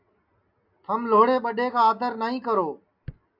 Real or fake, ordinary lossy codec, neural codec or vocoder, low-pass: real; MP3, 48 kbps; none; 5.4 kHz